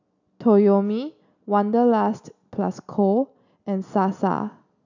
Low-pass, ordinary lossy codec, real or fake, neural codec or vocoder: 7.2 kHz; none; real; none